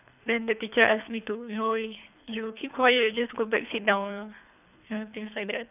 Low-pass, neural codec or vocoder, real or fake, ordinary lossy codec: 3.6 kHz; codec, 24 kHz, 3 kbps, HILCodec; fake; none